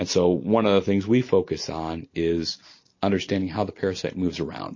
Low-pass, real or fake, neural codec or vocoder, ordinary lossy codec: 7.2 kHz; real; none; MP3, 32 kbps